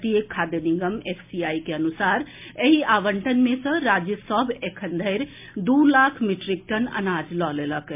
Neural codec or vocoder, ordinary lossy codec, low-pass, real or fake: none; MP3, 32 kbps; 3.6 kHz; real